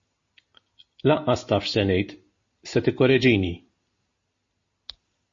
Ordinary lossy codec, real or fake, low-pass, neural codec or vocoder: MP3, 32 kbps; real; 7.2 kHz; none